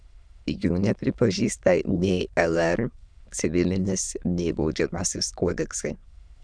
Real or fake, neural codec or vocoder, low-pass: fake; autoencoder, 22.05 kHz, a latent of 192 numbers a frame, VITS, trained on many speakers; 9.9 kHz